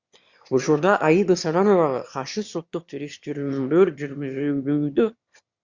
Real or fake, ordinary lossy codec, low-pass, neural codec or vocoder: fake; Opus, 64 kbps; 7.2 kHz; autoencoder, 22.05 kHz, a latent of 192 numbers a frame, VITS, trained on one speaker